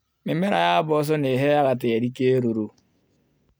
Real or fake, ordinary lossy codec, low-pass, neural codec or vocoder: real; none; none; none